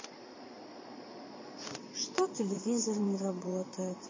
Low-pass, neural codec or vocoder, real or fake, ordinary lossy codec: 7.2 kHz; vocoder, 22.05 kHz, 80 mel bands, Vocos; fake; MP3, 32 kbps